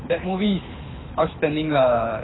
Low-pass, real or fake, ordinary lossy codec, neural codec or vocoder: 7.2 kHz; fake; AAC, 16 kbps; codec, 24 kHz, 6 kbps, HILCodec